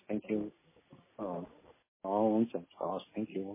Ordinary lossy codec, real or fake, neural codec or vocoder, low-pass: MP3, 16 kbps; real; none; 3.6 kHz